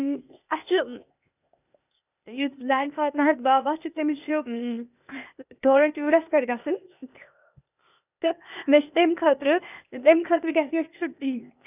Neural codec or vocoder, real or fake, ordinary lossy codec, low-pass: codec, 16 kHz, 0.8 kbps, ZipCodec; fake; none; 3.6 kHz